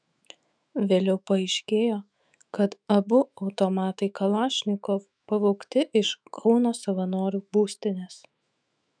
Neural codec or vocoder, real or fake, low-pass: autoencoder, 48 kHz, 128 numbers a frame, DAC-VAE, trained on Japanese speech; fake; 9.9 kHz